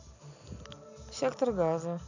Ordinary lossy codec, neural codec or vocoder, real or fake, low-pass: none; none; real; 7.2 kHz